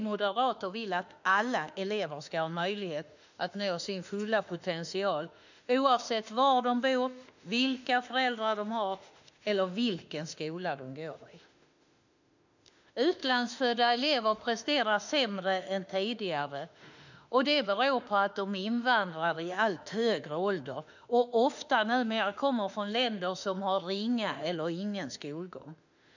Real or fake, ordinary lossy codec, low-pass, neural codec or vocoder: fake; none; 7.2 kHz; autoencoder, 48 kHz, 32 numbers a frame, DAC-VAE, trained on Japanese speech